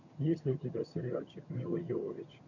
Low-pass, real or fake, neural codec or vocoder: 7.2 kHz; fake; vocoder, 22.05 kHz, 80 mel bands, HiFi-GAN